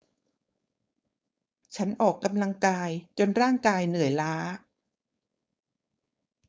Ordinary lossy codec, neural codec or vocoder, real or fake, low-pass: none; codec, 16 kHz, 4.8 kbps, FACodec; fake; none